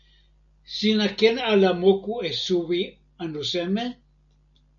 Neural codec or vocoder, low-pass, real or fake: none; 7.2 kHz; real